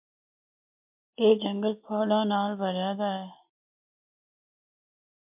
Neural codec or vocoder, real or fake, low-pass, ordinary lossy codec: none; real; 3.6 kHz; MP3, 32 kbps